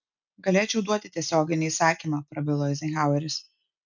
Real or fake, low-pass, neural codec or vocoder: real; 7.2 kHz; none